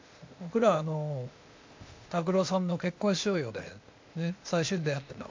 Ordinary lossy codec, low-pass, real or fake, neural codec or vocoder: MP3, 48 kbps; 7.2 kHz; fake; codec, 16 kHz, 0.8 kbps, ZipCodec